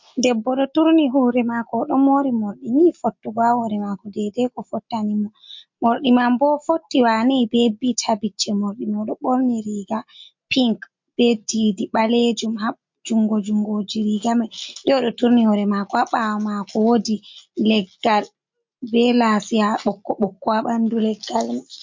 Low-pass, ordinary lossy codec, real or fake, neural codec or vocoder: 7.2 kHz; MP3, 48 kbps; real; none